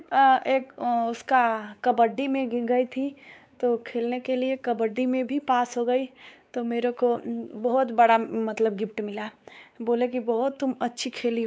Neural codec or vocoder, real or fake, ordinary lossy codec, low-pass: codec, 16 kHz, 4 kbps, X-Codec, WavLM features, trained on Multilingual LibriSpeech; fake; none; none